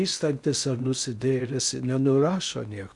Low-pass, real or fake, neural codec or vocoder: 10.8 kHz; fake; codec, 16 kHz in and 24 kHz out, 0.6 kbps, FocalCodec, streaming, 4096 codes